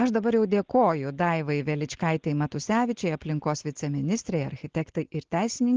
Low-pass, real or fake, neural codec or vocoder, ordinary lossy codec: 7.2 kHz; real; none; Opus, 16 kbps